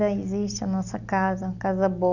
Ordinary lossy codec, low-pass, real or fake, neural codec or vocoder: none; 7.2 kHz; real; none